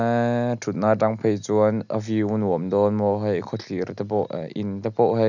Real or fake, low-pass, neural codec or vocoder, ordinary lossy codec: real; 7.2 kHz; none; none